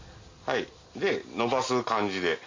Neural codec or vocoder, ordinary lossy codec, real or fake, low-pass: none; MP3, 48 kbps; real; 7.2 kHz